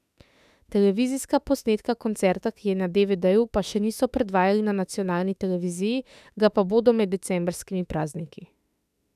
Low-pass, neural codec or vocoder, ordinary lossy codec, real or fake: 14.4 kHz; autoencoder, 48 kHz, 32 numbers a frame, DAC-VAE, trained on Japanese speech; none; fake